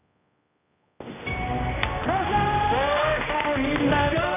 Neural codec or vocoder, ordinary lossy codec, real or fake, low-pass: codec, 16 kHz, 1 kbps, X-Codec, HuBERT features, trained on general audio; none; fake; 3.6 kHz